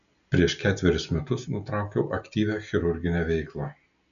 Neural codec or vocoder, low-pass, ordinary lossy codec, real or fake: none; 7.2 kHz; MP3, 96 kbps; real